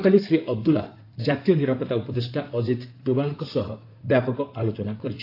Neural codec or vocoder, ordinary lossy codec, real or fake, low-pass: codec, 16 kHz in and 24 kHz out, 2.2 kbps, FireRedTTS-2 codec; AAC, 32 kbps; fake; 5.4 kHz